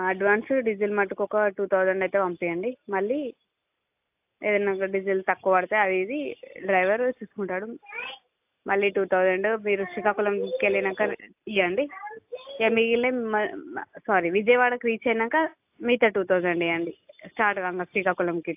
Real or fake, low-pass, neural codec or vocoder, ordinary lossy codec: real; 3.6 kHz; none; AAC, 32 kbps